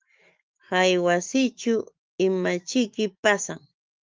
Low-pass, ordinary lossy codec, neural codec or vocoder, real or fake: 7.2 kHz; Opus, 32 kbps; autoencoder, 48 kHz, 128 numbers a frame, DAC-VAE, trained on Japanese speech; fake